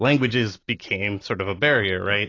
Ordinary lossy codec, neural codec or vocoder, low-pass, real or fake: AAC, 32 kbps; none; 7.2 kHz; real